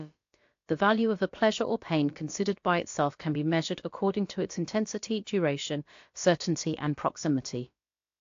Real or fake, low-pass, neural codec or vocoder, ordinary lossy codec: fake; 7.2 kHz; codec, 16 kHz, about 1 kbps, DyCAST, with the encoder's durations; AAC, 48 kbps